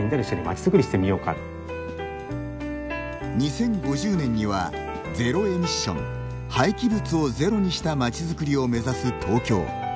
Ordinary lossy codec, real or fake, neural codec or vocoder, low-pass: none; real; none; none